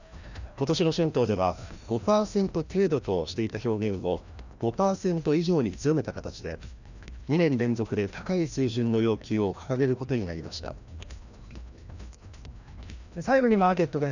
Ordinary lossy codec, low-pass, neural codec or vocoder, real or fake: none; 7.2 kHz; codec, 16 kHz, 1 kbps, FreqCodec, larger model; fake